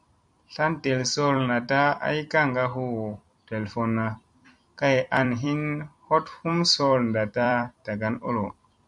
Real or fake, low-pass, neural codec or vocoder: fake; 10.8 kHz; vocoder, 44.1 kHz, 128 mel bands every 256 samples, BigVGAN v2